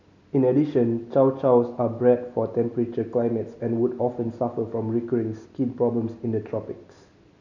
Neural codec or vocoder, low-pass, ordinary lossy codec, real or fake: none; 7.2 kHz; none; real